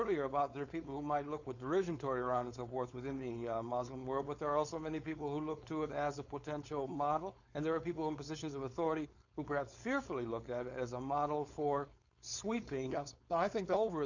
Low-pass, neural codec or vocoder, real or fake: 7.2 kHz; codec, 16 kHz, 4.8 kbps, FACodec; fake